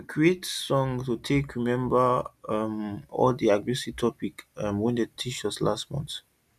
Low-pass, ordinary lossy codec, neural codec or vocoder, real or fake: 14.4 kHz; none; none; real